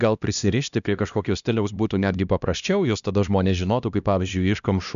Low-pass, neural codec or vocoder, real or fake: 7.2 kHz; codec, 16 kHz, 1 kbps, X-Codec, HuBERT features, trained on LibriSpeech; fake